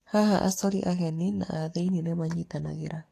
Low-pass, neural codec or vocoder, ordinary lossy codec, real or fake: 14.4 kHz; codec, 44.1 kHz, 7.8 kbps, DAC; AAC, 48 kbps; fake